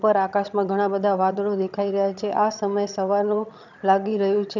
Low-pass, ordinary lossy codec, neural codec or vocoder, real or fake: 7.2 kHz; none; vocoder, 22.05 kHz, 80 mel bands, HiFi-GAN; fake